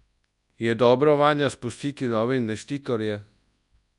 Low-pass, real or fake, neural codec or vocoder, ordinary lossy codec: 10.8 kHz; fake; codec, 24 kHz, 0.9 kbps, WavTokenizer, large speech release; none